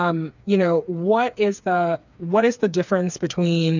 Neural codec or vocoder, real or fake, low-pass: codec, 16 kHz, 4 kbps, FreqCodec, smaller model; fake; 7.2 kHz